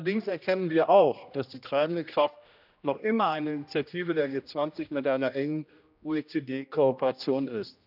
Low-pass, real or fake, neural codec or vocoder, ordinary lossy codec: 5.4 kHz; fake; codec, 16 kHz, 1 kbps, X-Codec, HuBERT features, trained on general audio; none